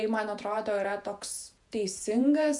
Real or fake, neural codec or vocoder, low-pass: fake; vocoder, 48 kHz, 128 mel bands, Vocos; 10.8 kHz